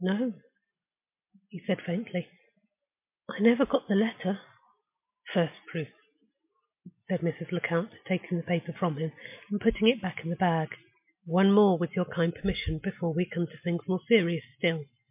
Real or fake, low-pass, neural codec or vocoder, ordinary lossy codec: real; 3.6 kHz; none; MP3, 32 kbps